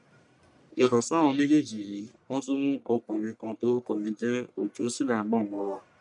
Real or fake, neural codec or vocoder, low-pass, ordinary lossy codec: fake; codec, 44.1 kHz, 1.7 kbps, Pupu-Codec; 10.8 kHz; none